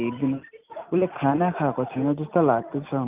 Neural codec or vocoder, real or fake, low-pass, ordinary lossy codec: none; real; 3.6 kHz; Opus, 32 kbps